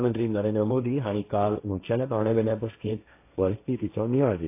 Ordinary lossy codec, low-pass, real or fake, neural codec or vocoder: AAC, 24 kbps; 3.6 kHz; fake; codec, 16 kHz, 1.1 kbps, Voila-Tokenizer